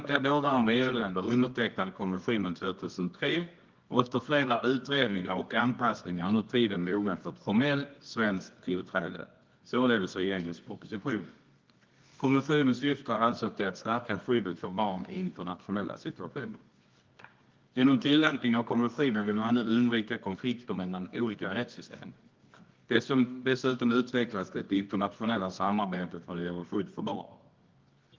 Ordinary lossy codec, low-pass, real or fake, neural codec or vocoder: Opus, 32 kbps; 7.2 kHz; fake; codec, 24 kHz, 0.9 kbps, WavTokenizer, medium music audio release